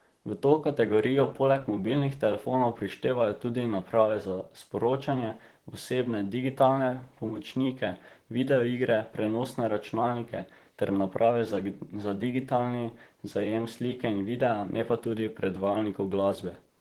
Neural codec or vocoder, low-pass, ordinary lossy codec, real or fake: vocoder, 44.1 kHz, 128 mel bands, Pupu-Vocoder; 19.8 kHz; Opus, 16 kbps; fake